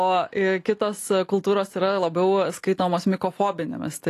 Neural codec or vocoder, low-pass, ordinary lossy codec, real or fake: none; 14.4 kHz; AAC, 48 kbps; real